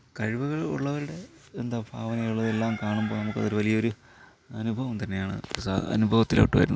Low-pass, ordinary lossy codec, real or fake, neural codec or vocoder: none; none; real; none